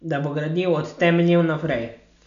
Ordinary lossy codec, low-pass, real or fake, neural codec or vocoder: none; 7.2 kHz; real; none